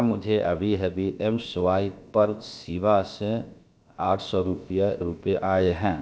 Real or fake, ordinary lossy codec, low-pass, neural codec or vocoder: fake; none; none; codec, 16 kHz, about 1 kbps, DyCAST, with the encoder's durations